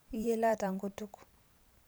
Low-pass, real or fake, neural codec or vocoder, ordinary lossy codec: none; fake; vocoder, 44.1 kHz, 128 mel bands every 512 samples, BigVGAN v2; none